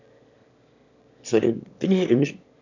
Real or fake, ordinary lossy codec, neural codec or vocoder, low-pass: fake; none; autoencoder, 22.05 kHz, a latent of 192 numbers a frame, VITS, trained on one speaker; 7.2 kHz